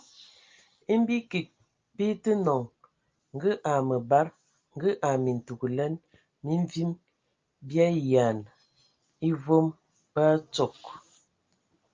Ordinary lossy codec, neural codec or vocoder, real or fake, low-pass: Opus, 24 kbps; none; real; 7.2 kHz